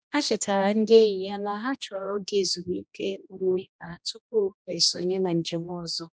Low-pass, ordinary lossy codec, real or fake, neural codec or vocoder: none; none; fake; codec, 16 kHz, 1 kbps, X-Codec, HuBERT features, trained on general audio